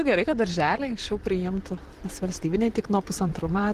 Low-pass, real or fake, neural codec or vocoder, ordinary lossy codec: 14.4 kHz; fake; vocoder, 44.1 kHz, 128 mel bands, Pupu-Vocoder; Opus, 16 kbps